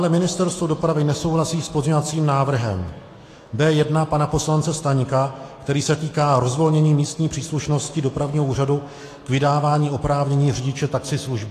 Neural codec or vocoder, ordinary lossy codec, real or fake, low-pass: autoencoder, 48 kHz, 128 numbers a frame, DAC-VAE, trained on Japanese speech; AAC, 48 kbps; fake; 14.4 kHz